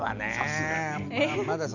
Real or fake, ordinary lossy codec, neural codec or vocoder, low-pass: real; none; none; 7.2 kHz